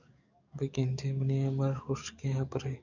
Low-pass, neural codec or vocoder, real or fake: 7.2 kHz; codec, 44.1 kHz, 7.8 kbps, DAC; fake